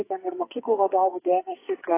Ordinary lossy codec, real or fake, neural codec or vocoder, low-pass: AAC, 16 kbps; fake; codec, 44.1 kHz, 2.6 kbps, SNAC; 3.6 kHz